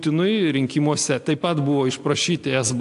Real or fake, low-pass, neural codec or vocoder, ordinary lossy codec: real; 10.8 kHz; none; AAC, 64 kbps